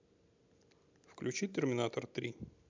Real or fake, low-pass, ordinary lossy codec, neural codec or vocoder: real; 7.2 kHz; MP3, 64 kbps; none